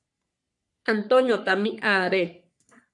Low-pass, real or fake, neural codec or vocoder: 10.8 kHz; fake; codec, 44.1 kHz, 3.4 kbps, Pupu-Codec